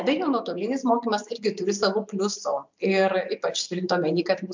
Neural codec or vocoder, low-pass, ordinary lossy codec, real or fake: none; 7.2 kHz; MP3, 64 kbps; real